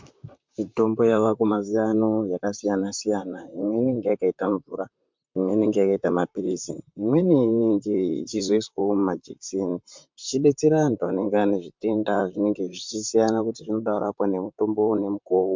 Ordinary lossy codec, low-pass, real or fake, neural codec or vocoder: MP3, 64 kbps; 7.2 kHz; fake; vocoder, 44.1 kHz, 128 mel bands, Pupu-Vocoder